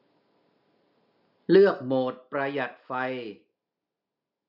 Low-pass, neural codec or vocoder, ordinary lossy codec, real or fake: 5.4 kHz; none; none; real